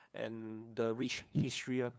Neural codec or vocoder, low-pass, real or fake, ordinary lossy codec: codec, 16 kHz, 2 kbps, FunCodec, trained on LibriTTS, 25 frames a second; none; fake; none